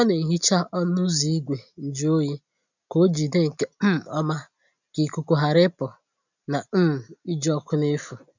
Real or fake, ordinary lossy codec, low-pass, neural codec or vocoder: real; none; 7.2 kHz; none